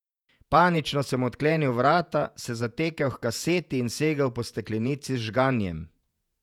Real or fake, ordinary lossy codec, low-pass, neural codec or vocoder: fake; none; 19.8 kHz; vocoder, 48 kHz, 128 mel bands, Vocos